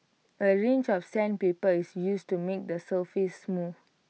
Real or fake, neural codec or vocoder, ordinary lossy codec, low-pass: real; none; none; none